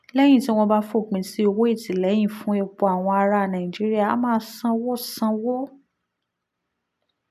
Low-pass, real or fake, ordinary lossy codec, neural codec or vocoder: 14.4 kHz; real; none; none